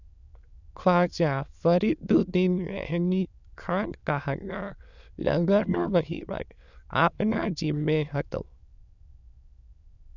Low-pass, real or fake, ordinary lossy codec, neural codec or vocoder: 7.2 kHz; fake; none; autoencoder, 22.05 kHz, a latent of 192 numbers a frame, VITS, trained on many speakers